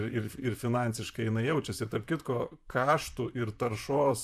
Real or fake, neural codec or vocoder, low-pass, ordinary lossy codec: fake; vocoder, 44.1 kHz, 128 mel bands, Pupu-Vocoder; 14.4 kHz; AAC, 96 kbps